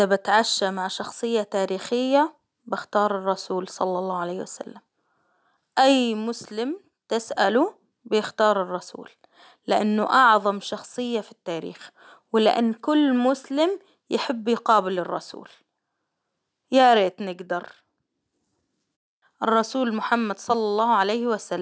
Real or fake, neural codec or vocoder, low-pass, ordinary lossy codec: real; none; none; none